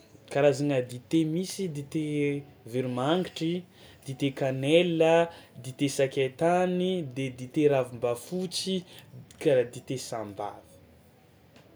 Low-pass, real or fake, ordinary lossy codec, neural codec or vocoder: none; real; none; none